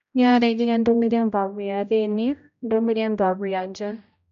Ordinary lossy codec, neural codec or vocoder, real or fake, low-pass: none; codec, 16 kHz, 0.5 kbps, X-Codec, HuBERT features, trained on general audio; fake; 7.2 kHz